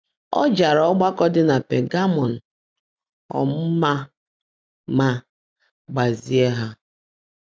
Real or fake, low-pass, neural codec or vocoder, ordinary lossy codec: real; none; none; none